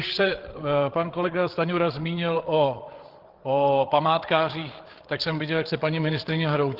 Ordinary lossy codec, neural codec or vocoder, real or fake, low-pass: Opus, 16 kbps; codec, 16 kHz, 8 kbps, FreqCodec, larger model; fake; 5.4 kHz